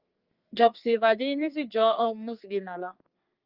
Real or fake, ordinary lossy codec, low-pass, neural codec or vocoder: fake; Opus, 24 kbps; 5.4 kHz; codec, 32 kHz, 1.9 kbps, SNAC